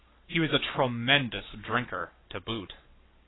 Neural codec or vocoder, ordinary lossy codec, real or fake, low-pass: codec, 44.1 kHz, 7.8 kbps, Pupu-Codec; AAC, 16 kbps; fake; 7.2 kHz